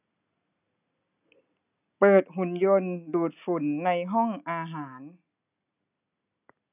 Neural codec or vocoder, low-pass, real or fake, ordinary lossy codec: none; 3.6 kHz; real; none